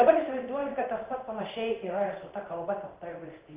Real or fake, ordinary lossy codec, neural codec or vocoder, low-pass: fake; Opus, 24 kbps; codec, 16 kHz in and 24 kHz out, 1 kbps, XY-Tokenizer; 3.6 kHz